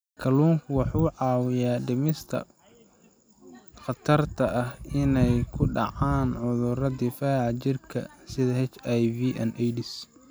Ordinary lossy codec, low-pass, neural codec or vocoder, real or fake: none; none; none; real